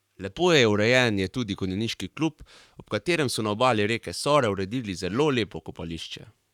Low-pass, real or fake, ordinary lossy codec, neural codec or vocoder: 19.8 kHz; fake; none; codec, 44.1 kHz, 7.8 kbps, Pupu-Codec